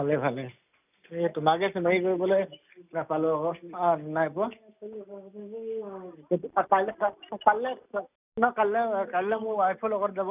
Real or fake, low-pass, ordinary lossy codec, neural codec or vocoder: real; 3.6 kHz; none; none